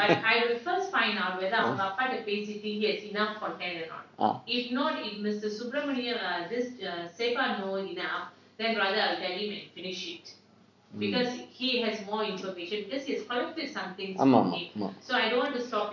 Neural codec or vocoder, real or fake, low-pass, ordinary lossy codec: none; real; 7.2 kHz; MP3, 64 kbps